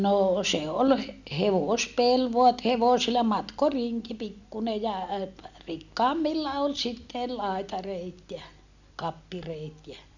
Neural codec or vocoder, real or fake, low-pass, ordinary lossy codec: none; real; 7.2 kHz; none